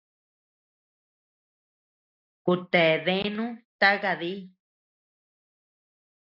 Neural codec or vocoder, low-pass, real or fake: none; 5.4 kHz; real